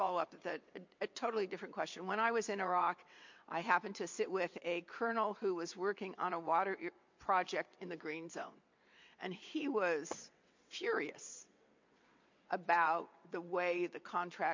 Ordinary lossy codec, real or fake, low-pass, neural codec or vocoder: MP3, 48 kbps; fake; 7.2 kHz; vocoder, 22.05 kHz, 80 mel bands, WaveNeXt